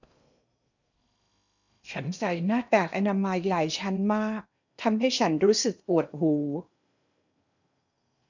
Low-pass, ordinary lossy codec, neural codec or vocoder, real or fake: 7.2 kHz; none; codec, 16 kHz in and 24 kHz out, 0.8 kbps, FocalCodec, streaming, 65536 codes; fake